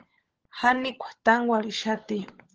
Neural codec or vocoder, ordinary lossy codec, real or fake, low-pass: codec, 16 kHz, 16 kbps, FunCodec, trained on LibriTTS, 50 frames a second; Opus, 16 kbps; fake; 7.2 kHz